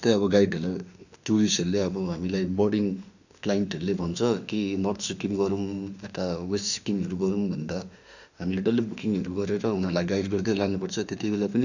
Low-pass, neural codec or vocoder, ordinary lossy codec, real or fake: 7.2 kHz; autoencoder, 48 kHz, 32 numbers a frame, DAC-VAE, trained on Japanese speech; none; fake